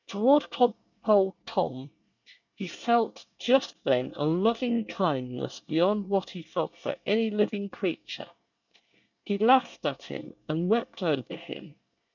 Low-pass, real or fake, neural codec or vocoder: 7.2 kHz; fake; codec, 24 kHz, 1 kbps, SNAC